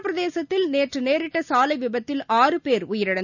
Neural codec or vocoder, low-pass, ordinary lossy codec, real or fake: none; 7.2 kHz; none; real